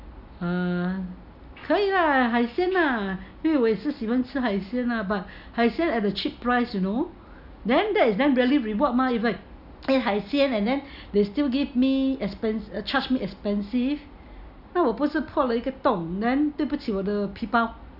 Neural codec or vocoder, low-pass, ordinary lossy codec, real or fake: none; 5.4 kHz; none; real